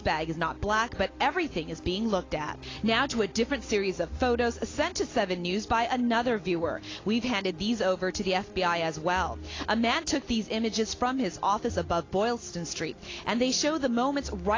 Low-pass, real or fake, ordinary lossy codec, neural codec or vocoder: 7.2 kHz; real; AAC, 32 kbps; none